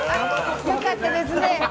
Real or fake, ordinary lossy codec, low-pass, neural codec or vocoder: real; none; none; none